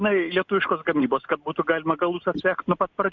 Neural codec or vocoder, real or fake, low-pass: none; real; 7.2 kHz